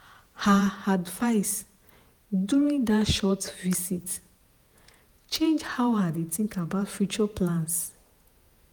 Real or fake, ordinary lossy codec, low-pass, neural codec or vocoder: fake; none; none; vocoder, 48 kHz, 128 mel bands, Vocos